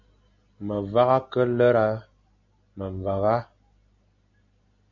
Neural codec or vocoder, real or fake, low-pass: none; real; 7.2 kHz